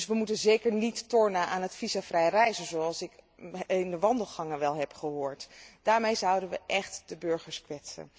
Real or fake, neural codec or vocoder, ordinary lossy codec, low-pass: real; none; none; none